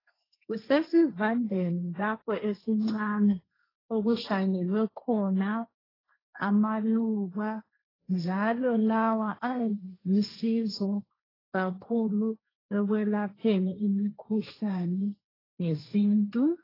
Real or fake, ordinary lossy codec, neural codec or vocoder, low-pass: fake; AAC, 24 kbps; codec, 16 kHz, 1.1 kbps, Voila-Tokenizer; 5.4 kHz